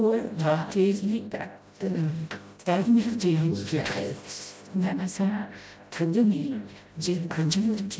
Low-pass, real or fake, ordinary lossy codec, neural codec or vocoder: none; fake; none; codec, 16 kHz, 0.5 kbps, FreqCodec, smaller model